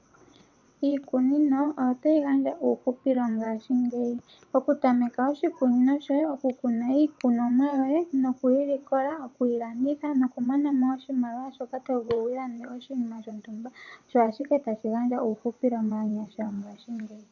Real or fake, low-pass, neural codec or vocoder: fake; 7.2 kHz; vocoder, 22.05 kHz, 80 mel bands, WaveNeXt